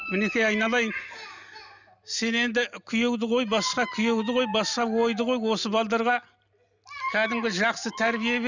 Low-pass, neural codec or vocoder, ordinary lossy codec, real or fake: 7.2 kHz; none; none; real